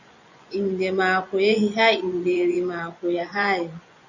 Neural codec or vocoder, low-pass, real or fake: vocoder, 24 kHz, 100 mel bands, Vocos; 7.2 kHz; fake